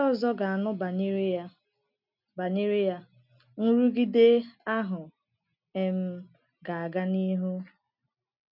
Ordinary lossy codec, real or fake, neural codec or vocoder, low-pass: none; real; none; 5.4 kHz